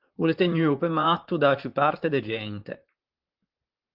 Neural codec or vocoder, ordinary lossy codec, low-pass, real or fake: vocoder, 44.1 kHz, 128 mel bands, Pupu-Vocoder; Opus, 32 kbps; 5.4 kHz; fake